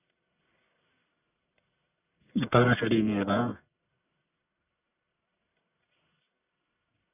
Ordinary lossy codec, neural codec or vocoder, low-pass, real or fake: none; codec, 44.1 kHz, 1.7 kbps, Pupu-Codec; 3.6 kHz; fake